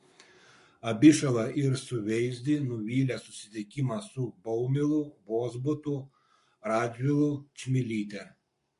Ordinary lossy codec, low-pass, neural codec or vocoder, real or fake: MP3, 48 kbps; 14.4 kHz; codec, 44.1 kHz, 7.8 kbps, Pupu-Codec; fake